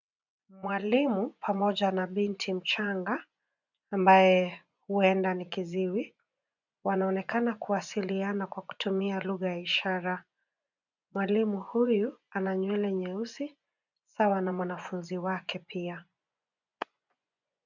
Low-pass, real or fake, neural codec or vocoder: 7.2 kHz; fake; vocoder, 24 kHz, 100 mel bands, Vocos